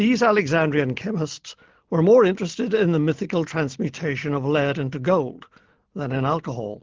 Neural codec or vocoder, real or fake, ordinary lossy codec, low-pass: none; real; Opus, 16 kbps; 7.2 kHz